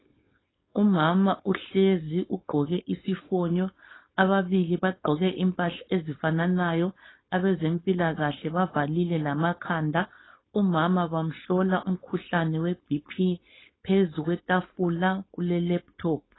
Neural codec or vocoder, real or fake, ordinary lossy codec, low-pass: codec, 16 kHz, 4.8 kbps, FACodec; fake; AAC, 16 kbps; 7.2 kHz